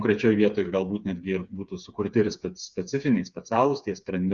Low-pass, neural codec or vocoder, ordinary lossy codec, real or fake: 7.2 kHz; codec, 16 kHz, 4 kbps, FreqCodec, smaller model; Opus, 64 kbps; fake